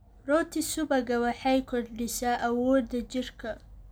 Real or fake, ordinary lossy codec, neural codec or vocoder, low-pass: real; none; none; none